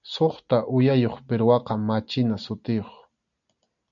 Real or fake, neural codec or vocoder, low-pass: real; none; 7.2 kHz